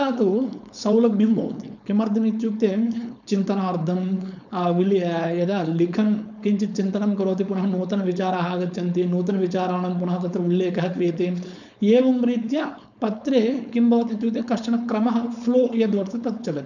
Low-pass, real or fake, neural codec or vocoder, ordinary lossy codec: 7.2 kHz; fake; codec, 16 kHz, 4.8 kbps, FACodec; none